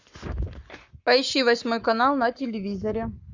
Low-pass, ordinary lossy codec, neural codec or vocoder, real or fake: 7.2 kHz; Opus, 64 kbps; codec, 44.1 kHz, 7.8 kbps, Pupu-Codec; fake